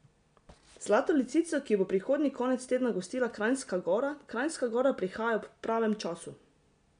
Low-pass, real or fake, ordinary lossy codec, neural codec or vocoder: 9.9 kHz; real; MP3, 64 kbps; none